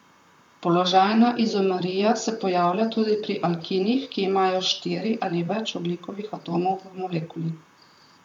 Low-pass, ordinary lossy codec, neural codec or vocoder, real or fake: 19.8 kHz; none; vocoder, 44.1 kHz, 128 mel bands, Pupu-Vocoder; fake